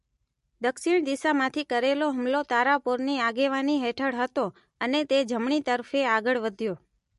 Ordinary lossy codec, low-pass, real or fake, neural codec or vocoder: MP3, 48 kbps; 14.4 kHz; real; none